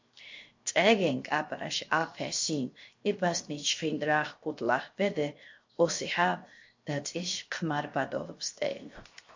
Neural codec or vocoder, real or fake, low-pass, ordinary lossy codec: codec, 16 kHz, 0.7 kbps, FocalCodec; fake; 7.2 kHz; MP3, 48 kbps